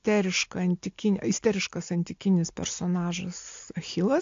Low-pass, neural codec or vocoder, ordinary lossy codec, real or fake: 7.2 kHz; none; AAC, 48 kbps; real